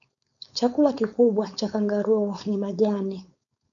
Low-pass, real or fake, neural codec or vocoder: 7.2 kHz; fake; codec, 16 kHz, 4.8 kbps, FACodec